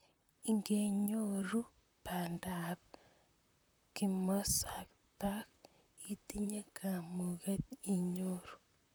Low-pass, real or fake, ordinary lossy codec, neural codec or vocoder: none; fake; none; vocoder, 44.1 kHz, 128 mel bands, Pupu-Vocoder